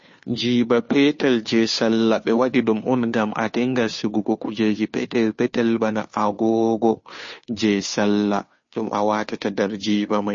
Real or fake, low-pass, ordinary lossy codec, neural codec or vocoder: fake; 7.2 kHz; MP3, 32 kbps; codec, 16 kHz, 2 kbps, FunCodec, trained on Chinese and English, 25 frames a second